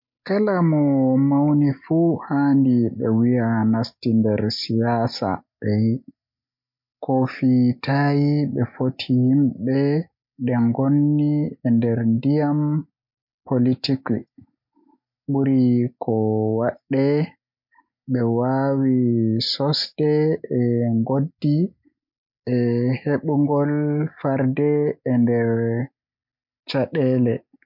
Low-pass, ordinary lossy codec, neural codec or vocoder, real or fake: 5.4 kHz; MP3, 48 kbps; none; real